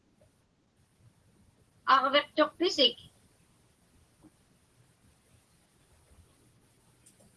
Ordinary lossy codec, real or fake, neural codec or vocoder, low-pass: Opus, 16 kbps; real; none; 10.8 kHz